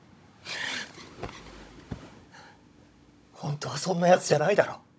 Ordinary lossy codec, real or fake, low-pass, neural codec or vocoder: none; fake; none; codec, 16 kHz, 16 kbps, FunCodec, trained on Chinese and English, 50 frames a second